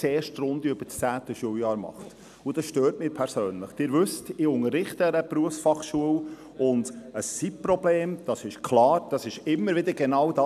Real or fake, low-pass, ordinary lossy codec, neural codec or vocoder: real; 14.4 kHz; none; none